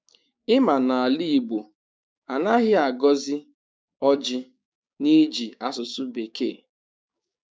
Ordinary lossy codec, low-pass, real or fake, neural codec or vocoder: none; none; fake; codec, 16 kHz, 6 kbps, DAC